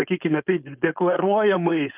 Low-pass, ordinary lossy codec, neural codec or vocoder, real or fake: 3.6 kHz; Opus, 24 kbps; codec, 16 kHz, 4.8 kbps, FACodec; fake